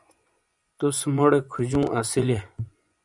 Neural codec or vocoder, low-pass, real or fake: vocoder, 24 kHz, 100 mel bands, Vocos; 10.8 kHz; fake